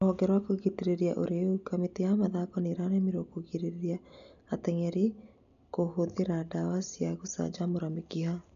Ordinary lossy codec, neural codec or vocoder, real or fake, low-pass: none; none; real; 7.2 kHz